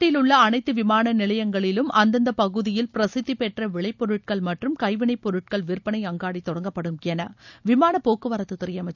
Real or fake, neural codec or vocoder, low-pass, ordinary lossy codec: real; none; 7.2 kHz; none